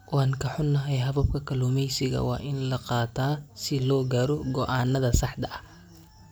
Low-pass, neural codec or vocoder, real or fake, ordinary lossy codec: none; none; real; none